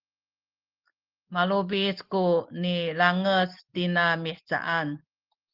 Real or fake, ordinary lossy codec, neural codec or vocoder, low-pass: real; Opus, 16 kbps; none; 5.4 kHz